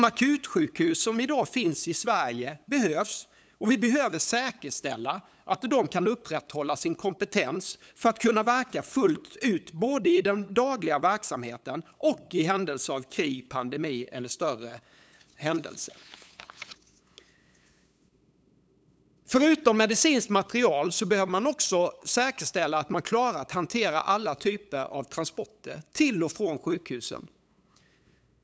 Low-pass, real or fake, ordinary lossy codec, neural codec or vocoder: none; fake; none; codec, 16 kHz, 8 kbps, FunCodec, trained on LibriTTS, 25 frames a second